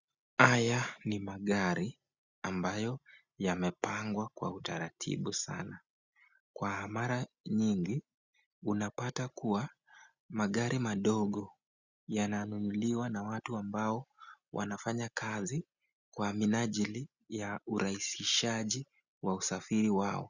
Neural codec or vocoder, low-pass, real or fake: none; 7.2 kHz; real